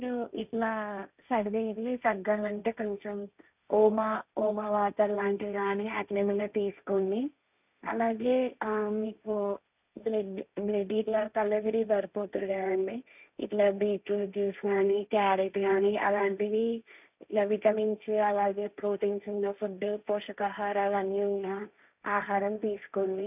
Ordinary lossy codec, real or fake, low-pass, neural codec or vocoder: none; fake; 3.6 kHz; codec, 16 kHz, 1.1 kbps, Voila-Tokenizer